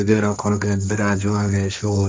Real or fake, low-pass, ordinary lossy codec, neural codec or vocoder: fake; none; none; codec, 16 kHz, 1.1 kbps, Voila-Tokenizer